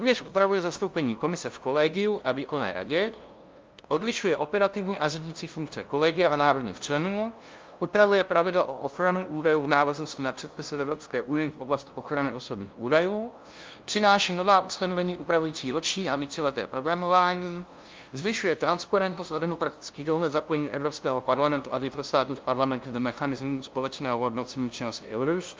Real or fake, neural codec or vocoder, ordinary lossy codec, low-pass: fake; codec, 16 kHz, 0.5 kbps, FunCodec, trained on LibriTTS, 25 frames a second; Opus, 24 kbps; 7.2 kHz